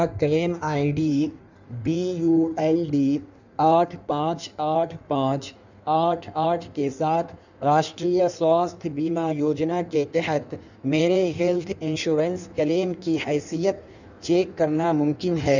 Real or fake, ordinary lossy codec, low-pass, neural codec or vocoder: fake; none; 7.2 kHz; codec, 16 kHz in and 24 kHz out, 1.1 kbps, FireRedTTS-2 codec